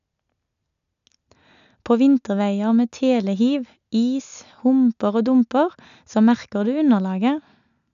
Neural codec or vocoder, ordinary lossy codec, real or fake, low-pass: none; none; real; 7.2 kHz